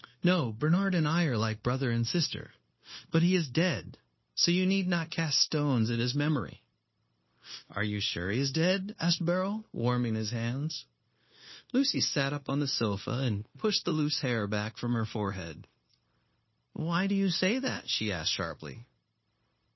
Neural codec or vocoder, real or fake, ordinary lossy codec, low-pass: codec, 16 kHz, 0.9 kbps, LongCat-Audio-Codec; fake; MP3, 24 kbps; 7.2 kHz